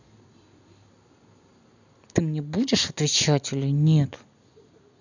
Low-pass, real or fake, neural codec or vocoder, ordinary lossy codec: 7.2 kHz; real; none; none